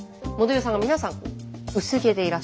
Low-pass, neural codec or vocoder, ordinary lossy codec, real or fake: none; none; none; real